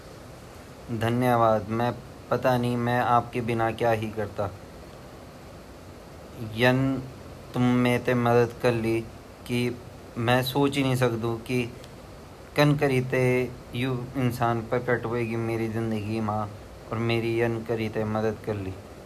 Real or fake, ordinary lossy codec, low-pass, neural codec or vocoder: real; none; 14.4 kHz; none